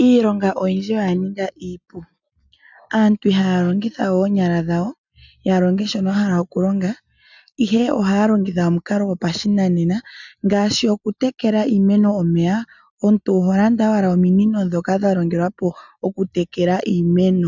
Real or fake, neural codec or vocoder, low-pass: real; none; 7.2 kHz